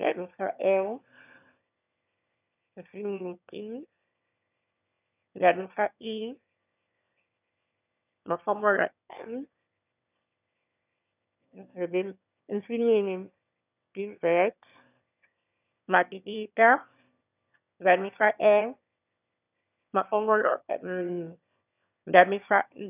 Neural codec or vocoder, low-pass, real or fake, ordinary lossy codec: autoencoder, 22.05 kHz, a latent of 192 numbers a frame, VITS, trained on one speaker; 3.6 kHz; fake; none